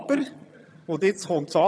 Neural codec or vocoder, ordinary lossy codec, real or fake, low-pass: vocoder, 22.05 kHz, 80 mel bands, HiFi-GAN; none; fake; none